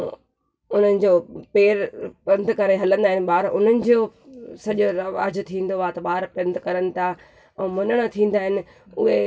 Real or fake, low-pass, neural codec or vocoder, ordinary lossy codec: real; none; none; none